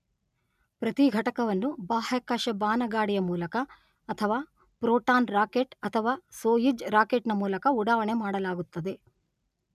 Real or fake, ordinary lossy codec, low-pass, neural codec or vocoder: real; none; 14.4 kHz; none